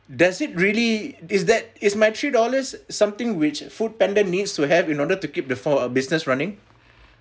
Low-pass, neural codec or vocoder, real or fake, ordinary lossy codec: none; none; real; none